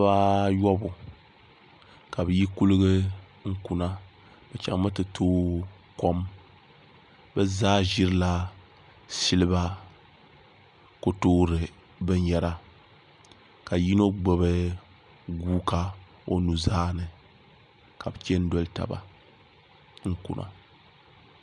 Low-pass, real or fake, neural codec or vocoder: 10.8 kHz; real; none